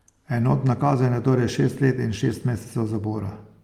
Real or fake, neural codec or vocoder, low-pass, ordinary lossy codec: real; none; 19.8 kHz; Opus, 32 kbps